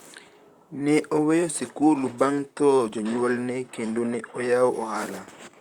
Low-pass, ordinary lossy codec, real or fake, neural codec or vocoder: 19.8 kHz; Opus, 64 kbps; fake; vocoder, 44.1 kHz, 128 mel bands, Pupu-Vocoder